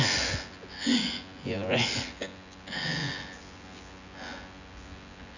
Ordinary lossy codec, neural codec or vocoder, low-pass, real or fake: none; vocoder, 24 kHz, 100 mel bands, Vocos; 7.2 kHz; fake